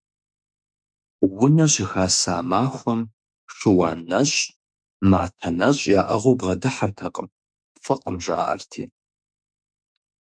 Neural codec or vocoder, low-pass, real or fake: autoencoder, 48 kHz, 32 numbers a frame, DAC-VAE, trained on Japanese speech; 9.9 kHz; fake